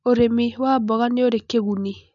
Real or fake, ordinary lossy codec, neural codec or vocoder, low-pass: real; none; none; 7.2 kHz